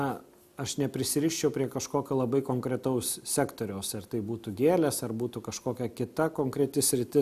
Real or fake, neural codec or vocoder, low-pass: real; none; 14.4 kHz